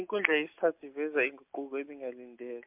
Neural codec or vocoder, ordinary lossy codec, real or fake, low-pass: none; MP3, 32 kbps; real; 3.6 kHz